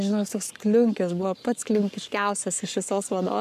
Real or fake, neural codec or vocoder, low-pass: fake; codec, 44.1 kHz, 7.8 kbps, Pupu-Codec; 14.4 kHz